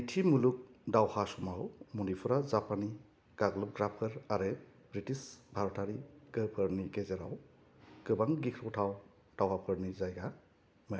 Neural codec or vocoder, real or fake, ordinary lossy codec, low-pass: none; real; none; none